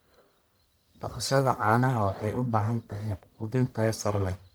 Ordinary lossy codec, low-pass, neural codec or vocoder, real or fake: none; none; codec, 44.1 kHz, 1.7 kbps, Pupu-Codec; fake